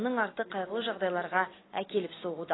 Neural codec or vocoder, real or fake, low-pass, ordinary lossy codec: none; real; 7.2 kHz; AAC, 16 kbps